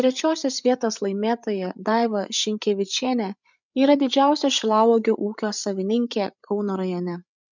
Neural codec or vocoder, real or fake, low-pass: codec, 16 kHz, 8 kbps, FreqCodec, larger model; fake; 7.2 kHz